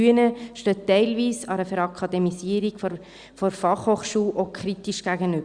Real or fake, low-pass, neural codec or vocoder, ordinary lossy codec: real; 9.9 kHz; none; Opus, 64 kbps